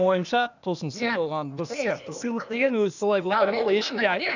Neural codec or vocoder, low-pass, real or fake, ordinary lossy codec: codec, 16 kHz, 0.8 kbps, ZipCodec; 7.2 kHz; fake; none